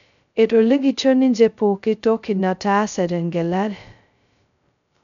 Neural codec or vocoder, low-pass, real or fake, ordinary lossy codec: codec, 16 kHz, 0.2 kbps, FocalCodec; 7.2 kHz; fake; none